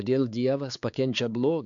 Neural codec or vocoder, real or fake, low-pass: codec, 16 kHz, 4 kbps, X-Codec, HuBERT features, trained on balanced general audio; fake; 7.2 kHz